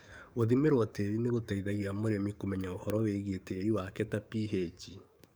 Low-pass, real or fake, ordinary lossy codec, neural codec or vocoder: none; fake; none; codec, 44.1 kHz, 7.8 kbps, DAC